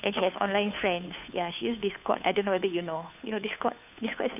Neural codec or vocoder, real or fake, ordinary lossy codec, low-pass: codec, 16 kHz, 2 kbps, FunCodec, trained on Chinese and English, 25 frames a second; fake; none; 3.6 kHz